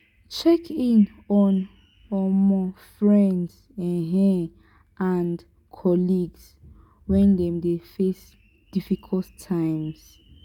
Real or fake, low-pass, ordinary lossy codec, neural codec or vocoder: real; 19.8 kHz; none; none